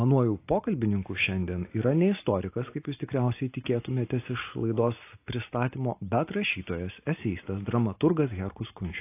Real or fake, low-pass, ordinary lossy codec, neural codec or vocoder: real; 3.6 kHz; AAC, 24 kbps; none